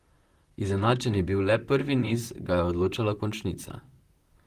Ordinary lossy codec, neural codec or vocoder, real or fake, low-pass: Opus, 24 kbps; vocoder, 44.1 kHz, 128 mel bands, Pupu-Vocoder; fake; 14.4 kHz